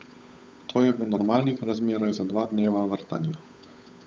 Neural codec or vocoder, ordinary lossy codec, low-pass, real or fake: codec, 16 kHz, 8 kbps, FunCodec, trained on Chinese and English, 25 frames a second; none; none; fake